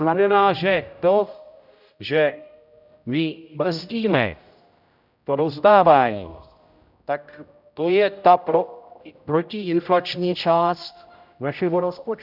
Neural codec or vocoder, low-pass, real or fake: codec, 16 kHz, 0.5 kbps, X-Codec, HuBERT features, trained on general audio; 5.4 kHz; fake